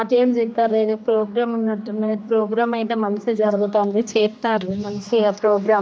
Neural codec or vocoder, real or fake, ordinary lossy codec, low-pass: codec, 16 kHz, 2 kbps, X-Codec, HuBERT features, trained on general audio; fake; none; none